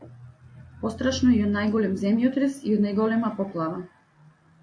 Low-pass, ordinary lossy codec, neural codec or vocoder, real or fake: 9.9 kHz; AAC, 48 kbps; none; real